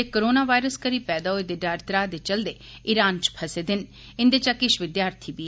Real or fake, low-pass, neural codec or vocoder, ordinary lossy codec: real; none; none; none